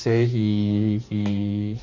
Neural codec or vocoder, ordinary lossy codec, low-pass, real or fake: codec, 16 kHz, 2 kbps, X-Codec, HuBERT features, trained on balanced general audio; Opus, 64 kbps; 7.2 kHz; fake